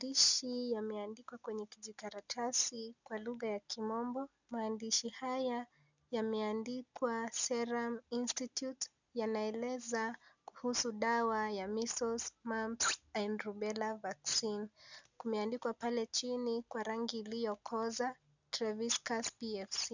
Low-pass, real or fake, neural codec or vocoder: 7.2 kHz; real; none